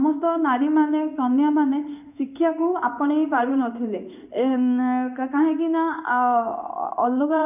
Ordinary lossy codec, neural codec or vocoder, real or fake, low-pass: none; codec, 16 kHz in and 24 kHz out, 1 kbps, XY-Tokenizer; fake; 3.6 kHz